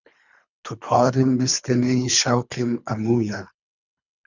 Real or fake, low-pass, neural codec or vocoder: fake; 7.2 kHz; codec, 24 kHz, 3 kbps, HILCodec